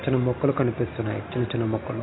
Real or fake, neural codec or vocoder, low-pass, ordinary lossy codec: real; none; 7.2 kHz; AAC, 16 kbps